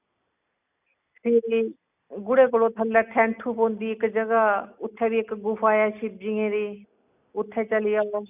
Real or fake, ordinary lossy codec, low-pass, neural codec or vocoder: real; none; 3.6 kHz; none